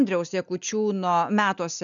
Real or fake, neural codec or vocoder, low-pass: real; none; 7.2 kHz